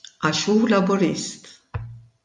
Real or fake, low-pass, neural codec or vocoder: real; 10.8 kHz; none